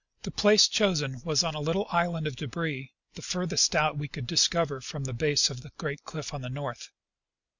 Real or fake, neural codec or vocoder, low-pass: real; none; 7.2 kHz